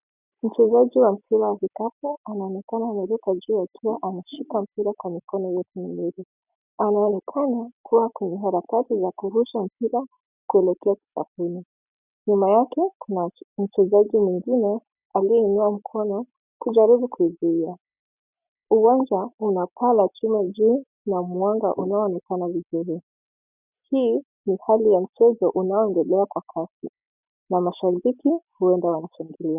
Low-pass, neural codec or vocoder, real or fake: 3.6 kHz; none; real